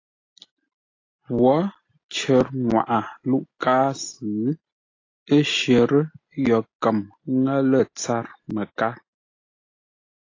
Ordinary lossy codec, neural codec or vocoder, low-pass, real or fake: AAC, 32 kbps; none; 7.2 kHz; real